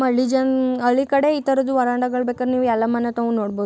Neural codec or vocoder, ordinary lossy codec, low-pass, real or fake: none; none; none; real